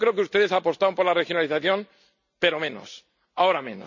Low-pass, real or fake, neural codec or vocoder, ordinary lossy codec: 7.2 kHz; real; none; none